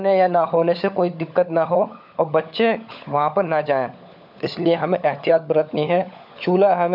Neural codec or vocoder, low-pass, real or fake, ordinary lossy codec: codec, 16 kHz, 16 kbps, FunCodec, trained on LibriTTS, 50 frames a second; 5.4 kHz; fake; none